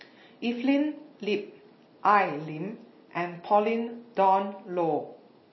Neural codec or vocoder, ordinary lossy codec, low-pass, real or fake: none; MP3, 24 kbps; 7.2 kHz; real